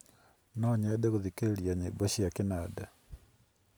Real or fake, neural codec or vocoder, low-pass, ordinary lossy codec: real; none; none; none